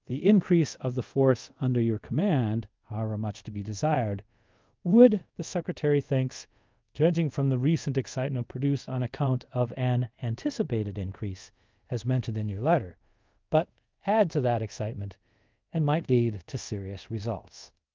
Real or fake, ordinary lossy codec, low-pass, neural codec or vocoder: fake; Opus, 32 kbps; 7.2 kHz; codec, 24 kHz, 0.5 kbps, DualCodec